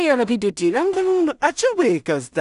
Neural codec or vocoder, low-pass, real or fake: codec, 16 kHz in and 24 kHz out, 0.4 kbps, LongCat-Audio-Codec, two codebook decoder; 10.8 kHz; fake